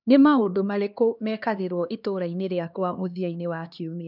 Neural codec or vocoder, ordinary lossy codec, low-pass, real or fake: codec, 16 kHz, 2 kbps, X-Codec, HuBERT features, trained on LibriSpeech; none; 5.4 kHz; fake